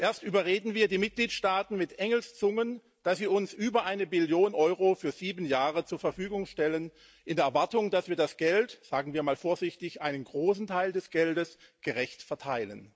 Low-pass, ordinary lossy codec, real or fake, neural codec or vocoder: none; none; real; none